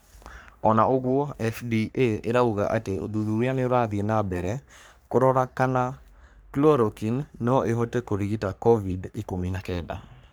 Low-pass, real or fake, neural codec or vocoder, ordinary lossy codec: none; fake; codec, 44.1 kHz, 3.4 kbps, Pupu-Codec; none